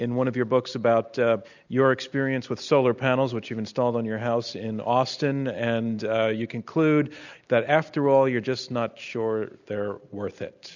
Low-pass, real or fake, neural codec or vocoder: 7.2 kHz; real; none